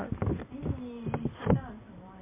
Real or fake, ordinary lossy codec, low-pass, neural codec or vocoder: real; MP3, 24 kbps; 3.6 kHz; none